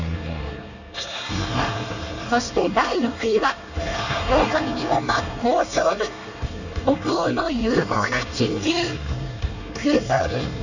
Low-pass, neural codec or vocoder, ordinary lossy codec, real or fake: 7.2 kHz; codec, 24 kHz, 1 kbps, SNAC; none; fake